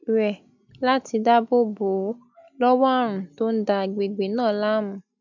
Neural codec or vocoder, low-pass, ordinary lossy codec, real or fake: none; 7.2 kHz; none; real